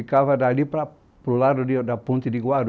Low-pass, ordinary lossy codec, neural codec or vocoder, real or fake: none; none; none; real